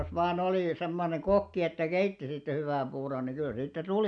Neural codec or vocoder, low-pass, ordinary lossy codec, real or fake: none; 9.9 kHz; none; real